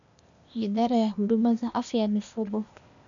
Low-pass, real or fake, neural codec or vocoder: 7.2 kHz; fake; codec, 16 kHz, 0.8 kbps, ZipCodec